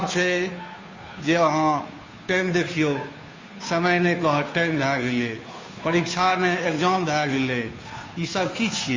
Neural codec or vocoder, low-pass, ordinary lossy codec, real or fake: codec, 16 kHz, 2 kbps, FunCodec, trained on Chinese and English, 25 frames a second; 7.2 kHz; MP3, 32 kbps; fake